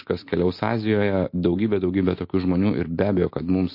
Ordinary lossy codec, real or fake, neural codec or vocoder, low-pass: MP3, 32 kbps; real; none; 5.4 kHz